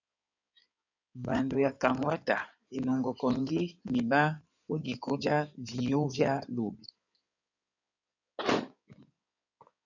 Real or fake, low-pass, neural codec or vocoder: fake; 7.2 kHz; codec, 16 kHz in and 24 kHz out, 2.2 kbps, FireRedTTS-2 codec